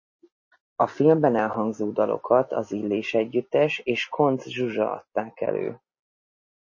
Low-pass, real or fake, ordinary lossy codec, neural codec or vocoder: 7.2 kHz; real; MP3, 32 kbps; none